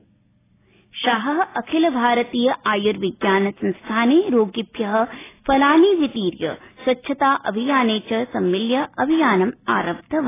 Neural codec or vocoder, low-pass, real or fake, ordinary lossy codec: none; 3.6 kHz; real; AAC, 16 kbps